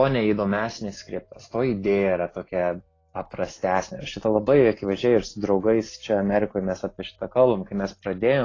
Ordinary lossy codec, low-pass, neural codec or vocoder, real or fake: AAC, 32 kbps; 7.2 kHz; none; real